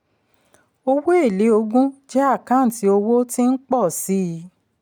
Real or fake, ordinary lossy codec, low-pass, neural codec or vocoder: real; none; none; none